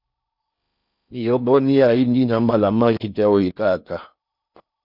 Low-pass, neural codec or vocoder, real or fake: 5.4 kHz; codec, 16 kHz in and 24 kHz out, 0.6 kbps, FocalCodec, streaming, 4096 codes; fake